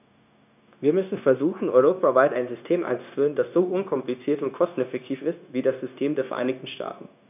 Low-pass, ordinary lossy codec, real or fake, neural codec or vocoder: 3.6 kHz; none; fake; codec, 16 kHz, 0.9 kbps, LongCat-Audio-Codec